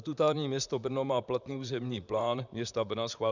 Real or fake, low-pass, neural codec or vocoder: fake; 7.2 kHz; vocoder, 44.1 kHz, 128 mel bands, Pupu-Vocoder